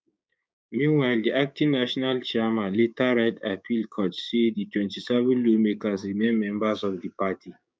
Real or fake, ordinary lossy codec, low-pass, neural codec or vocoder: fake; none; none; codec, 16 kHz, 6 kbps, DAC